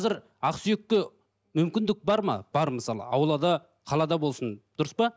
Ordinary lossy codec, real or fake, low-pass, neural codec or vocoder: none; real; none; none